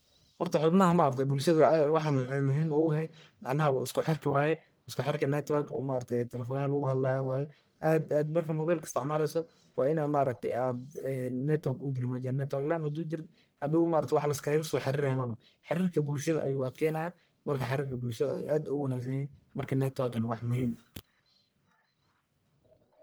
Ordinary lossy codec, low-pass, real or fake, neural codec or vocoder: none; none; fake; codec, 44.1 kHz, 1.7 kbps, Pupu-Codec